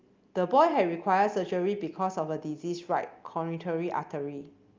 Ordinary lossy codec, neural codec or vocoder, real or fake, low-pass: Opus, 24 kbps; none; real; 7.2 kHz